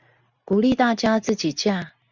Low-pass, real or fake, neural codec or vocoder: 7.2 kHz; real; none